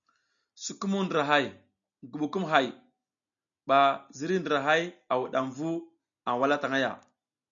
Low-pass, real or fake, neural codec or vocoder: 7.2 kHz; real; none